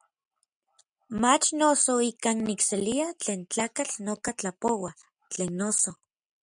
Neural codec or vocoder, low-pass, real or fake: none; 9.9 kHz; real